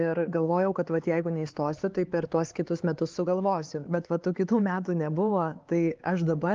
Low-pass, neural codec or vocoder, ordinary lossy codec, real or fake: 7.2 kHz; codec, 16 kHz, 4 kbps, X-Codec, HuBERT features, trained on LibriSpeech; Opus, 32 kbps; fake